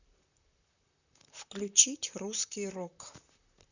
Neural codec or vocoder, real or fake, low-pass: vocoder, 44.1 kHz, 128 mel bands, Pupu-Vocoder; fake; 7.2 kHz